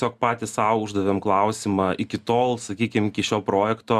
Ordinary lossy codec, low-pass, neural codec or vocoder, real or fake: Opus, 64 kbps; 14.4 kHz; none; real